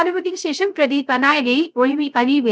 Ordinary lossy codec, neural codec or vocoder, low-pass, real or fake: none; codec, 16 kHz, 0.3 kbps, FocalCodec; none; fake